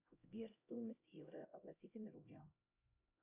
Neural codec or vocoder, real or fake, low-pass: codec, 16 kHz, 0.5 kbps, X-Codec, HuBERT features, trained on LibriSpeech; fake; 3.6 kHz